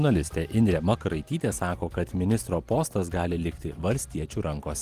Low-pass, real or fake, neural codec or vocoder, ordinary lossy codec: 14.4 kHz; fake; vocoder, 44.1 kHz, 128 mel bands every 256 samples, BigVGAN v2; Opus, 24 kbps